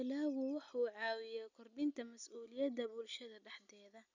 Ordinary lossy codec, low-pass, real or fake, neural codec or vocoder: none; 7.2 kHz; real; none